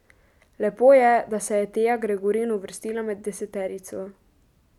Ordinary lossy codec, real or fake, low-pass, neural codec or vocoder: none; real; 19.8 kHz; none